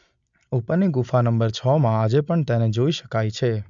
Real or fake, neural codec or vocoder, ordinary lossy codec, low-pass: real; none; MP3, 64 kbps; 7.2 kHz